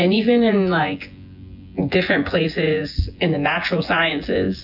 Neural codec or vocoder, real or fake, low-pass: vocoder, 24 kHz, 100 mel bands, Vocos; fake; 5.4 kHz